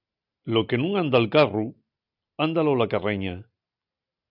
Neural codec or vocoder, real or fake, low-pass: none; real; 5.4 kHz